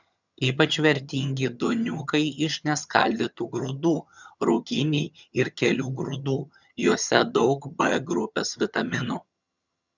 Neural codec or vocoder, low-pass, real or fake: vocoder, 22.05 kHz, 80 mel bands, HiFi-GAN; 7.2 kHz; fake